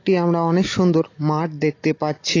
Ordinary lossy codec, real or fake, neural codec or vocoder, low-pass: AAC, 32 kbps; real; none; 7.2 kHz